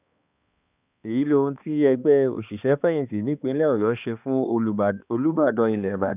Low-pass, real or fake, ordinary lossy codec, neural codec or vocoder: 3.6 kHz; fake; none; codec, 16 kHz, 2 kbps, X-Codec, HuBERT features, trained on balanced general audio